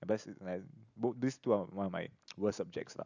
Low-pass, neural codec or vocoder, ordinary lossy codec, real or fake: 7.2 kHz; none; none; real